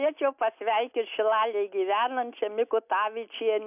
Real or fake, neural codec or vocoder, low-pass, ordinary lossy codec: real; none; 3.6 kHz; AAC, 32 kbps